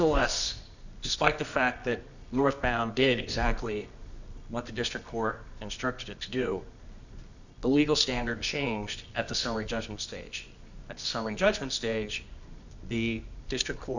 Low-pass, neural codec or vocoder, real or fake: 7.2 kHz; codec, 24 kHz, 0.9 kbps, WavTokenizer, medium music audio release; fake